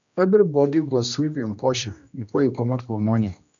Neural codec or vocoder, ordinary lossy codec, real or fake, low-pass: codec, 16 kHz, 1 kbps, X-Codec, HuBERT features, trained on general audio; none; fake; 7.2 kHz